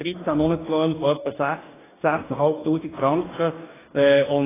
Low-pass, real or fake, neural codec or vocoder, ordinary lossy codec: 3.6 kHz; fake; codec, 44.1 kHz, 2.6 kbps, DAC; AAC, 16 kbps